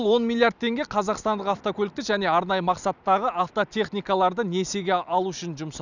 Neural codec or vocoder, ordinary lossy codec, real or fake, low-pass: none; none; real; 7.2 kHz